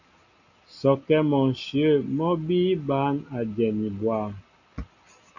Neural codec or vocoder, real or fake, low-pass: none; real; 7.2 kHz